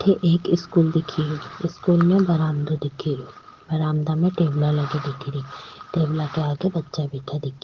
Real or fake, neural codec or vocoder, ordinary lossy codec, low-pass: real; none; Opus, 16 kbps; 7.2 kHz